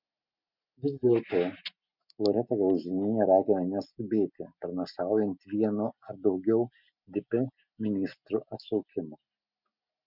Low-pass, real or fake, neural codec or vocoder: 5.4 kHz; real; none